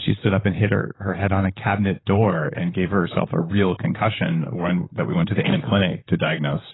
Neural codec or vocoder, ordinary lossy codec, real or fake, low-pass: vocoder, 44.1 kHz, 128 mel bands, Pupu-Vocoder; AAC, 16 kbps; fake; 7.2 kHz